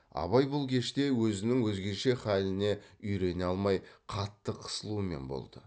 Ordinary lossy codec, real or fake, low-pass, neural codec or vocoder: none; real; none; none